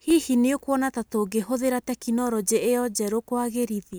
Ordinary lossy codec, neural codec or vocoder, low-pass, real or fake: none; none; none; real